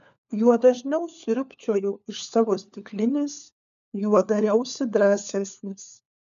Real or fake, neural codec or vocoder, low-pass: fake; codec, 16 kHz, 4 kbps, FunCodec, trained on LibriTTS, 50 frames a second; 7.2 kHz